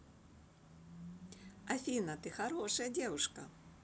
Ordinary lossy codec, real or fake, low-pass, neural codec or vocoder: none; real; none; none